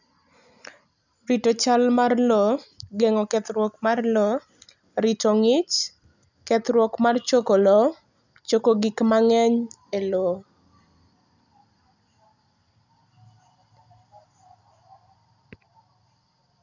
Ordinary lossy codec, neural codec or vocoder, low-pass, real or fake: none; none; 7.2 kHz; real